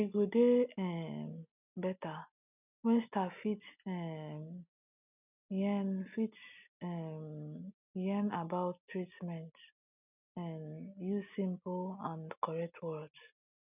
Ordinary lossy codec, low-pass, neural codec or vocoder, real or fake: none; 3.6 kHz; none; real